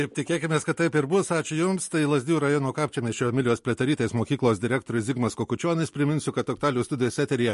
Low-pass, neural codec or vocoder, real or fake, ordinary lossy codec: 14.4 kHz; none; real; MP3, 48 kbps